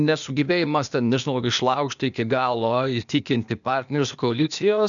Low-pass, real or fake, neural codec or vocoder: 7.2 kHz; fake; codec, 16 kHz, 0.8 kbps, ZipCodec